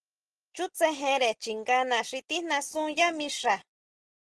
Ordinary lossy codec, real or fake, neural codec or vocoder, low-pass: Opus, 16 kbps; real; none; 10.8 kHz